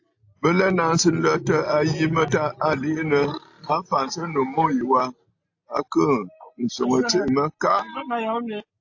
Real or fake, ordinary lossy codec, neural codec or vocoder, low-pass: real; AAC, 48 kbps; none; 7.2 kHz